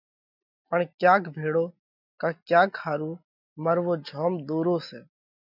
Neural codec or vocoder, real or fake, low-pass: none; real; 5.4 kHz